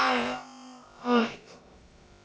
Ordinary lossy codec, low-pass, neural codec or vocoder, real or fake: none; none; codec, 16 kHz, about 1 kbps, DyCAST, with the encoder's durations; fake